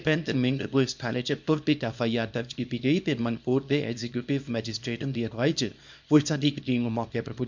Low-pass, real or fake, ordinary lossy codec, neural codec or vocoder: 7.2 kHz; fake; MP3, 64 kbps; codec, 24 kHz, 0.9 kbps, WavTokenizer, small release